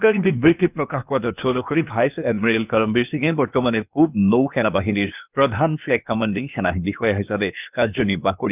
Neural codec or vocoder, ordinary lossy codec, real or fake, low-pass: codec, 16 kHz, 0.8 kbps, ZipCodec; none; fake; 3.6 kHz